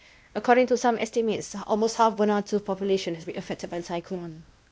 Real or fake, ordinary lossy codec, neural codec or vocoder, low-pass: fake; none; codec, 16 kHz, 1 kbps, X-Codec, WavLM features, trained on Multilingual LibriSpeech; none